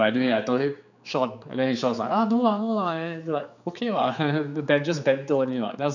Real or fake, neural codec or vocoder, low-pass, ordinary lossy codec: fake; codec, 16 kHz, 4 kbps, X-Codec, HuBERT features, trained on general audio; 7.2 kHz; none